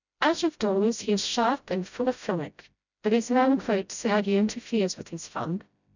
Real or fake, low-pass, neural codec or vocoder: fake; 7.2 kHz; codec, 16 kHz, 0.5 kbps, FreqCodec, smaller model